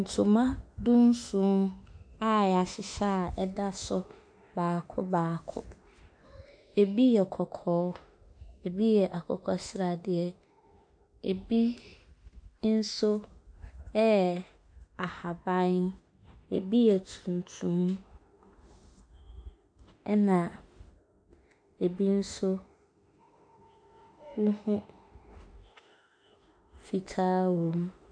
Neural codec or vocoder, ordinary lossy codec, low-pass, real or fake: autoencoder, 48 kHz, 32 numbers a frame, DAC-VAE, trained on Japanese speech; AAC, 64 kbps; 9.9 kHz; fake